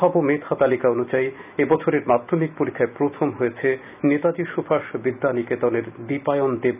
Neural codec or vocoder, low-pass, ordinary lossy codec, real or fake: none; 3.6 kHz; none; real